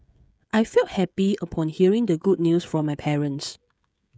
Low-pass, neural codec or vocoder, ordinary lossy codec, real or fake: none; codec, 16 kHz, 16 kbps, FreqCodec, smaller model; none; fake